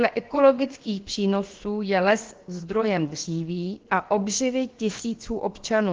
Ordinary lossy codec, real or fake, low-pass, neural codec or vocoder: Opus, 16 kbps; fake; 7.2 kHz; codec, 16 kHz, about 1 kbps, DyCAST, with the encoder's durations